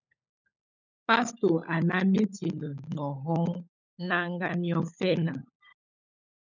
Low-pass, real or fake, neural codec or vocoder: 7.2 kHz; fake; codec, 16 kHz, 16 kbps, FunCodec, trained on LibriTTS, 50 frames a second